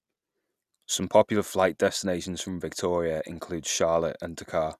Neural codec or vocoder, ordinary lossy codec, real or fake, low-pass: none; none; real; 14.4 kHz